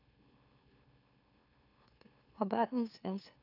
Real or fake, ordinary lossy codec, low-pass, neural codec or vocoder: fake; none; 5.4 kHz; autoencoder, 44.1 kHz, a latent of 192 numbers a frame, MeloTTS